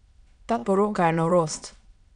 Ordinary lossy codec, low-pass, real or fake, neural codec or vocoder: none; 9.9 kHz; fake; autoencoder, 22.05 kHz, a latent of 192 numbers a frame, VITS, trained on many speakers